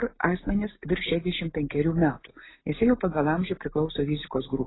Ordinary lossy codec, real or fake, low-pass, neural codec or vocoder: AAC, 16 kbps; real; 7.2 kHz; none